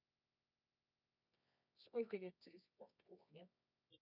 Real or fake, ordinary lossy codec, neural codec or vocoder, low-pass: fake; none; codec, 24 kHz, 0.9 kbps, WavTokenizer, medium music audio release; 5.4 kHz